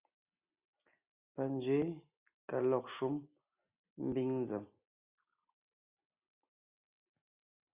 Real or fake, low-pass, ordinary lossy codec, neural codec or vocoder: real; 3.6 kHz; Opus, 64 kbps; none